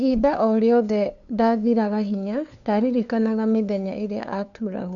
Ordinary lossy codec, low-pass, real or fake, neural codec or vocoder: none; 7.2 kHz; fake; codec, 16 kHz, 2 kbps, FunCodec, trained on LibriTTS, 25 frames a second